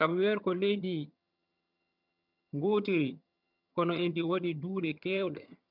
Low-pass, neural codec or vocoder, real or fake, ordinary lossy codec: 5.4 kHz; vocoder, 22.05 kHz, 80 mel bands, HiFi-GAN; fake; none